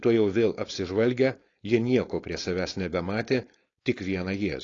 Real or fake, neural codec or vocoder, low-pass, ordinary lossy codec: fake; codec, 16 kHz, 4.8 kbps, FACodec; 7.2 kHz; AAC, 32 kbps